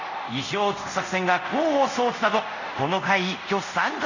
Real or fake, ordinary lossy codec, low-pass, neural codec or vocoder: fake; none; 7.2 kHz; codec, 24 kHz, 0.5 kbps, DualCodec